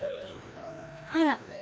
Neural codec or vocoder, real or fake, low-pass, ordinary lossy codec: codec, 16 kHz, 1 kbps, FreqCodec, larger model; fake; none; none